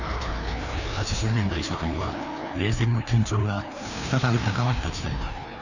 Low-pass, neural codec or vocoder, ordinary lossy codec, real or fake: 7.2 kHz; codec, 16 kHz, 2 kbps, FreqCodec, larger model; none; fake